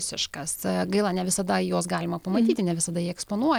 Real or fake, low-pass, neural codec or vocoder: real; 19.8 kHz; none